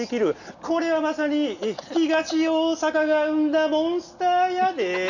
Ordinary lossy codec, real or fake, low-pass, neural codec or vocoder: none; real; 7.2 kHz; none